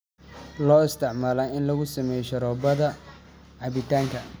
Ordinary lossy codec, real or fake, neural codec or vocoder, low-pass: none; real; none; none